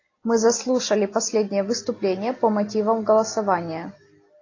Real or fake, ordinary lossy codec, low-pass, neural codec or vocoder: real; AAC, 32 kbps; 7.2 kHz; none